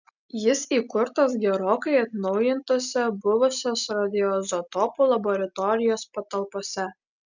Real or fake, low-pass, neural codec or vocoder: real; 7.2 kHz; none